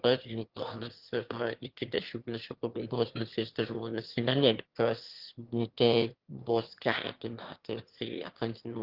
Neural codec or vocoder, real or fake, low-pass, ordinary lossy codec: autoencoder, 22.05 kHz, a latent of 192 numbers a frame, VITS, trained on one speaker; fake; 5.4 kHz; Opus, 16 kbps